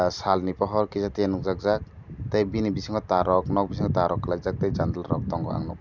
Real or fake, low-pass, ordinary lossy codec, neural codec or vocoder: real; 7.2 kHz; none; none